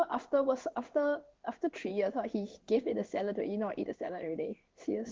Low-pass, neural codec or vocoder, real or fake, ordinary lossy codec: 7.2 kHz; codec, 16 kHz in and 24 kHz out, 1 kbps, XY-Tokenizer; fake; Opus, 16 kbps